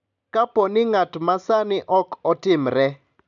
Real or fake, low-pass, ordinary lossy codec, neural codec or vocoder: real; 7.2 kHz; none; none